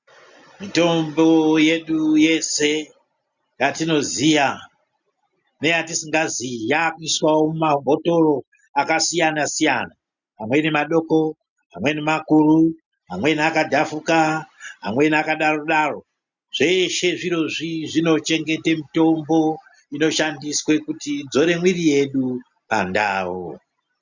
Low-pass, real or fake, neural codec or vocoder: 7.2 kHz; real; none